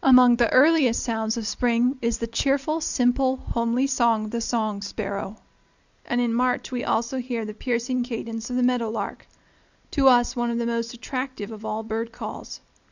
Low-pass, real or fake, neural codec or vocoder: 7.2 kHz; real; none